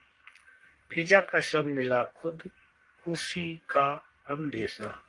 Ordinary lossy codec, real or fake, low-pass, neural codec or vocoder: Opus, 24 kbps; fake; 10.8 kHz; codec, 44.1 kHz, 1.7 kbps, Pupu-Codec